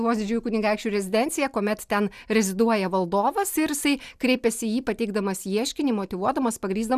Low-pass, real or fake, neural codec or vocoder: 14.4 kHz; real; none